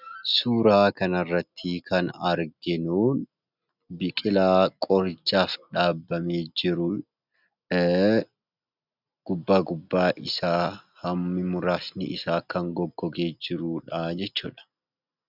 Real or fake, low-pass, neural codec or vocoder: real; 5.4 kHz; none